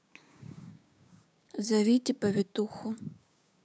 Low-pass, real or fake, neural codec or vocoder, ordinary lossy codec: none; fake; codec, 16 kHz, 6 kbps, DAC; none